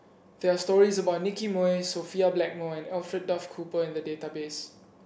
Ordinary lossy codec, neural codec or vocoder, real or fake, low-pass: none; none; real; none